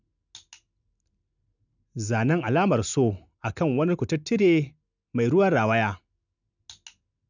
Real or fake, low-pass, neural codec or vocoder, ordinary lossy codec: real; 7.2 kHz; none; none